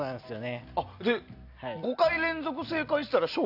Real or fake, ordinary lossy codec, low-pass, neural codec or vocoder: real; none; 5.4 kHz; none